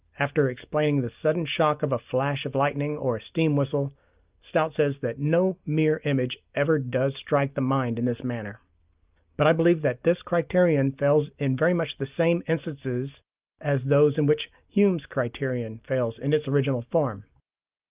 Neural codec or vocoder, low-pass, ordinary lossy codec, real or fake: none; 3.6 kHz; Opus, 32 kbps; real